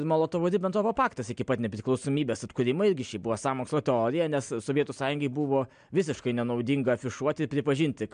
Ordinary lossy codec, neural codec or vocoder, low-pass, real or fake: MP3, 64 kbps; none; 9.9 kHz; real